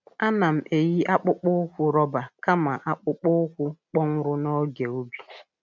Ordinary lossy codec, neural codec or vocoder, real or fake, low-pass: none; none; real; none